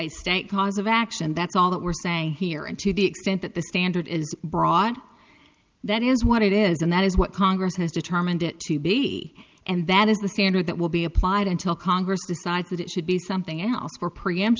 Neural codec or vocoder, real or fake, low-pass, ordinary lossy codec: none; real; 7.2 kHz; Opus, 32 kbps